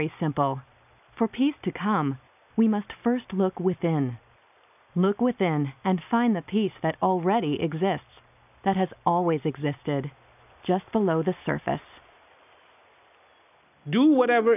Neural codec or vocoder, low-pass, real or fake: none; 3.6 kHz; real